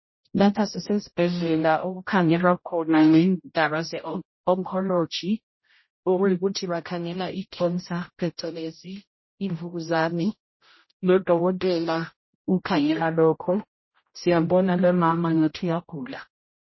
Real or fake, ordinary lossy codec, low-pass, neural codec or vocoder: fake; MP3, 24 kbps; 7.2 kHz; codec, 16 kHz, 0.5 kbps, X-Codec, HuBERT features, trained on general audio